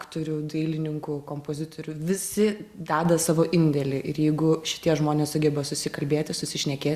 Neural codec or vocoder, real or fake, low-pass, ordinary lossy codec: none; real; 14.4 kHz; Opus, 64 kbps